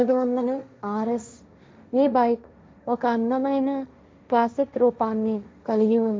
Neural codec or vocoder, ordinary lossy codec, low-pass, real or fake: codec, 16 kHz, 1.1 kbps, Voila-Tokenizer; none; none; fake